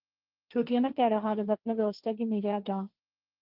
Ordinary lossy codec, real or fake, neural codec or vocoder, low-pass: Opus, 32 kbps; fake; codec, 16 kHz, 1.1 kbps, Voila-Tokenizer; 5.4 kHz